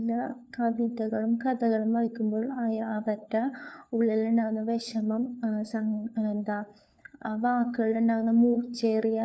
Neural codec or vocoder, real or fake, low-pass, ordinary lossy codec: codec, 16 kHz, 4 kbps, FunCodec, trained on LibriTTS, 50 frames a second; fake; none; none